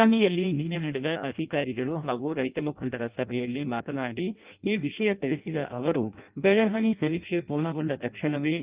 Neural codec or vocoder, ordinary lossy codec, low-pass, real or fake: codec, 16 kHz in and 24 kHz out, 0.6 kbps, FireRedTTS-2 codec; Opus, 32 kbps; 3.6 kHz; fake